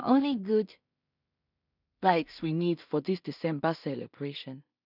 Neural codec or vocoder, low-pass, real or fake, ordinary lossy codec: codec, 16 kHz in and 24 kHz out, 0.4 kbps, LongCat-Audio-Codec, two codebook decoder; 5.4 kHz; fake; none